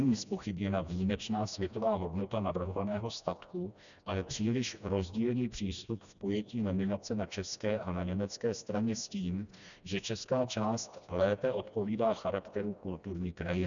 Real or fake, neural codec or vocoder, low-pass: fake; codec, 16 kHz, 1 kbps, FreqCodec, smaller model; 7.2 kHz